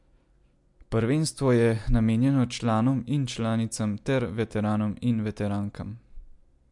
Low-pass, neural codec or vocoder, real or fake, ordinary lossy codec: 10.8 kHz; none; real; MP3, 64 kbps